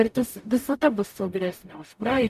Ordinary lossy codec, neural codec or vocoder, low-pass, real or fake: MP3, 96 kbps; codec, 44.1 kHz, 0.9 kbps, DAC; 14.4 kHz; fake